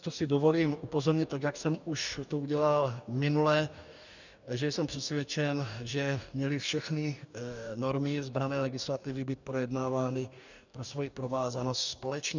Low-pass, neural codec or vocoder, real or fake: 7.2 kHz; codec, 44.1 kHz, 2.6 kbps, DAC; fake